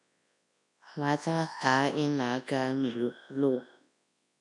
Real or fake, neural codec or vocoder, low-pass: fake; codec, 24 kHz, 0.9 kbps, WavTokenizer, large speech release; 10.8 kHz